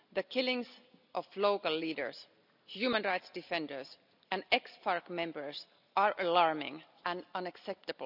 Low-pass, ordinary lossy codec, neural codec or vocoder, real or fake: 5.4 kHz; none; none; real